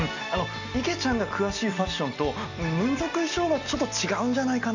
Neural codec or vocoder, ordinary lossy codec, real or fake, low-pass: none; none; real; 7.2 kHz